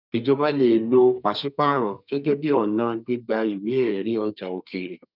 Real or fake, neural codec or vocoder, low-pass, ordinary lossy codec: fake; codec, 32 kHz, 1.9 kbps, SNAC; 5.4 kHz; none